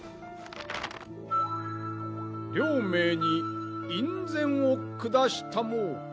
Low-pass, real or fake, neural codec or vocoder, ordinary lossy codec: none; real; none; none